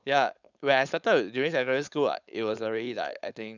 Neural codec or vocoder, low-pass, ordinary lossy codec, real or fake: codec, 16 kHz, 4.8 kbps, FACodec; 7.2 kHz; none; fake